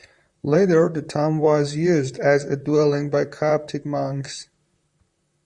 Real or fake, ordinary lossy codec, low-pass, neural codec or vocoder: fake; Opus, 64 kbps; 10.8 kHz; vocoder, 44.1 kHz, 128 mel bands, Pupu-Vocoder